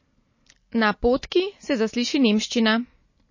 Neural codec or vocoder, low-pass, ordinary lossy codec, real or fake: none; 7.2 kHz; MP3, 32 kbps; real